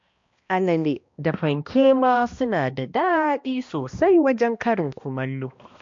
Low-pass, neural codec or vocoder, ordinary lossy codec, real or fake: 7.2 kHz; codec, 16 kHz, 1 kbps, X-Codec, HuBERT features, trained on balanced general audio; MP3, 48 kbps; fake